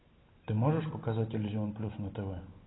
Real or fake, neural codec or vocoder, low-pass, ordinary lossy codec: real; none; 7.2 kHz; AAC, 16 kbps